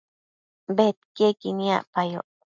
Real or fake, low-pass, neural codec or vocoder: real; 7.2 kHz; none